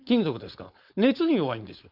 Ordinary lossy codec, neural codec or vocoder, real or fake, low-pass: Opus, 64 kbps; codec, 16 kHz, 4.8 kbps, FACodec; fake; 5.4 kHz